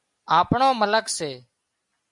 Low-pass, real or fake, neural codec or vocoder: 10.8 kHz; real; none